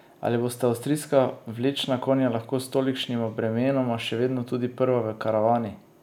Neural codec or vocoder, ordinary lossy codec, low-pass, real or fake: none; none; 19.8 kHz; real